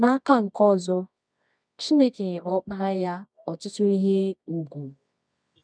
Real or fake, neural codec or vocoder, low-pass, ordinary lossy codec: fake; codec, 24 kHz, 0.9 kbps, WavTokenizer, medium music audio release; 9.9 kHz; none